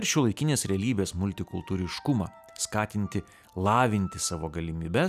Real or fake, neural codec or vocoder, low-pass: real; none; 14.4 kHz